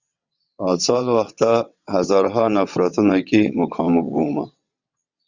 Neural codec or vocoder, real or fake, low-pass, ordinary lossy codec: vocoder, 22.05 kHz, 80 mel bands, WaveNeXt; fake; 7.2 kHz; Opus, 64 kbps